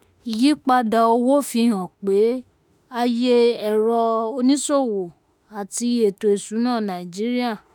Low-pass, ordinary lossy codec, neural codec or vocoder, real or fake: none; none; autoencoder, 48 kHz, 32 numbers a frame, DAC-VAE, trained on Japanese speech; fake